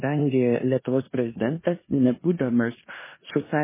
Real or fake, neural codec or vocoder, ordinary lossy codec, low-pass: fake; codec, 16 kHz in and 24 kHz out, 0.9 kbps, LongCat-Audio-Codec, four codebook decoder; MP3, 16 kbps; 3.6 kHz